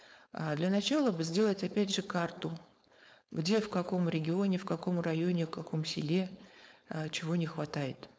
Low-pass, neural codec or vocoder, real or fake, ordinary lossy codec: none; codec, 16 kHz, 4.8 kbps, FACodec; fake; none